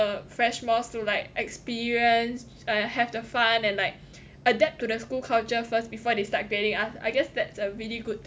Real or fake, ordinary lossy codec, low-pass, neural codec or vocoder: real; none; none; none